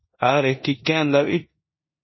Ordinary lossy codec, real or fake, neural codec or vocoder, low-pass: MP3, 24 kbps; fake; codec, 16 kHz in and 24 kHz out, 0.9 kbps, LongCat-Audio-Codec, four codebook decoder; 7.2 kHz